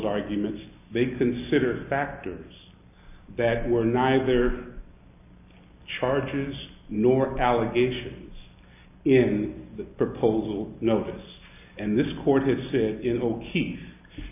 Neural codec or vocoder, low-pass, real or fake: none; 3.6 kHz; real